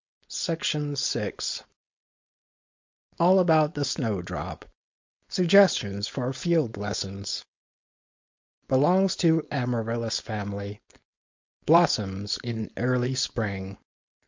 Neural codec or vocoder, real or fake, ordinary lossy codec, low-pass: codec, 16 kHz, 4.8 kbps, FACodec; fake; MP3, 48 kbps; 7.2 kHz